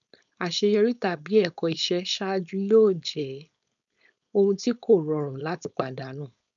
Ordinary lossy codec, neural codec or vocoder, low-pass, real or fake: none; codec, 16 kHz, 4.8 kbps, FACodec; 7.2 kHz; fake